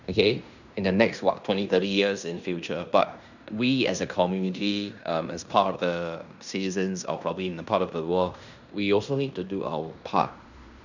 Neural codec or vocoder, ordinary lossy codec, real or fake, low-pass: codec, 16 kHz in and 24 kHz out, 0.9 kbps, LongCat-Audio-Codec, fine tuned four codebook decoder; none; fake; 7.2 kHz